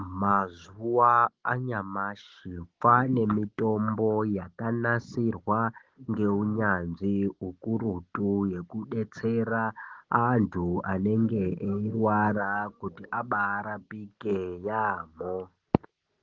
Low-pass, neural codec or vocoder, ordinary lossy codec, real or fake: 7.2 kHz; none; Opus, 16 kbps; real